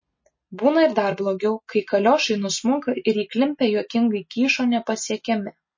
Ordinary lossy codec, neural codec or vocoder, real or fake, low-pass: MP3, 32 kbps; none; real; 7.2 kHz